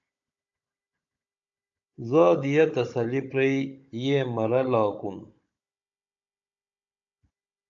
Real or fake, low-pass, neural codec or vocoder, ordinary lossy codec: fake; 7.2 kHz; codec, 16 kHz, 16 kbps, FunCodec, trained on Chinese and English, 50 frames a second; AAC, 64 kbps